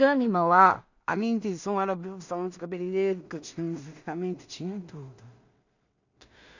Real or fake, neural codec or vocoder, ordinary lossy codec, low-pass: fake; codec, 16 kHz in and 24 kHz out, 0.4 kbps, LongCat-Audio-Codec, two codebook decoder; none; 7.2 kHz